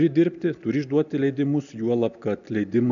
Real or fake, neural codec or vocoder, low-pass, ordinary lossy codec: real; none; 7.2 kHz; MP3, 96 kbps